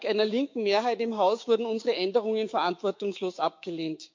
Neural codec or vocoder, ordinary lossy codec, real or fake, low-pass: codec, 16 kHz, 6 kbps, DAC; MP3, 48 kbps; fake; 7.2 kHz